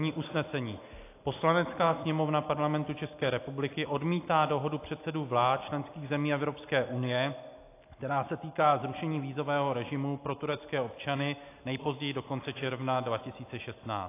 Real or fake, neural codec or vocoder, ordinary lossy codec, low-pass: real; none; AAC, 24 kbps; 3.6 kHz